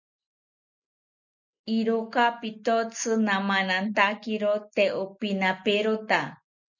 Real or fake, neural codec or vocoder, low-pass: real; none; 7.2 kHz